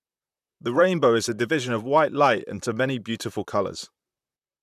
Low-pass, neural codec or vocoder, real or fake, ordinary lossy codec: 14.4 kHz; vocoder, 44.1 kHz, 128 mel bands, Pupu-Vocoder; fake; none